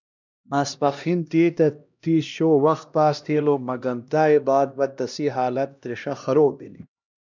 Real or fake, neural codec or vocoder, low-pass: fake; codec, 16 kHz, 1 kbps, X-Codec, HuBERT features, trained on LibriSpeech; 7.2 kHz